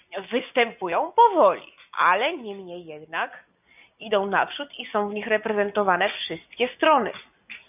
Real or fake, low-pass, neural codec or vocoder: real; 3.6 kHz; none